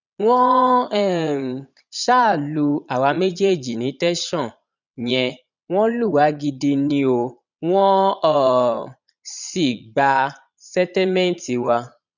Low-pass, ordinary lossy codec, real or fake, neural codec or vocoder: 7.2 kHz; none; fake; vocoder, 22.05 kHz, 80 mel bands, Vocos